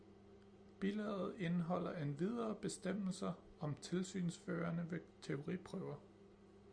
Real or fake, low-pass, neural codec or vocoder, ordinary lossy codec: real; 9.9 kHz; none; Opus, 64 kbps